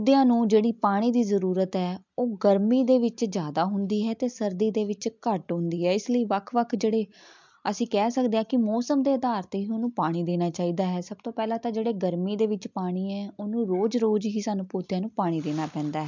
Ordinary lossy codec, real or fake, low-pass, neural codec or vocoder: MP3, 64 kbps; real; 7.2 kHz; none